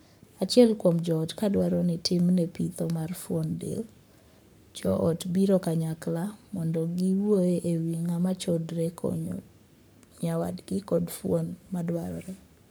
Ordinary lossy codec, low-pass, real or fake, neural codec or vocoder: none; none; fake; codec, 44.1 kHz, 7.8 kbps, Pupu-Codec